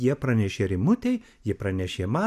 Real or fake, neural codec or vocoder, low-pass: real; none; 14.4 kHz